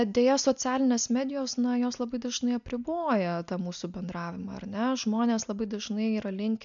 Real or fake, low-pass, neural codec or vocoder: real; 7.2 kHz; none